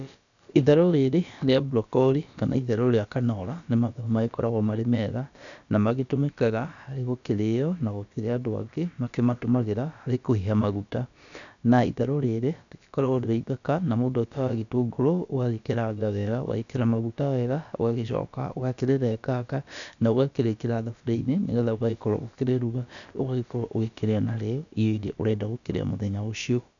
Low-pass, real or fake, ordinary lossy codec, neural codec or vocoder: 7.2 kHz; fake; none; codec, 16 kHz, about 1 kbps, DyCAST, with the encoder's durations